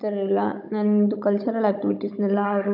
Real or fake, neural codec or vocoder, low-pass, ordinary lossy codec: fake; codec, 16 kHz, 16 kbps, FunCodec, trained on Chinese and English, 50 frames a second; 5.4 kHz; none